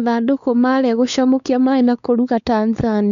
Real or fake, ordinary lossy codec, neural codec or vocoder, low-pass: fake; none; codec, 16 kHz, 4 kbps, X-Codec, WavLM features, trained on Multilingual LibriSpeech; 7.2 kHz